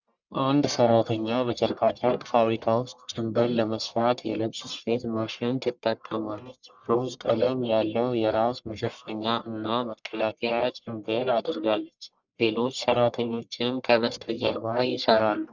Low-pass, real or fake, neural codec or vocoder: 7.2 kHz; fake; codec, 44.1 kHz, 1.7 kbps, Pupu-Codec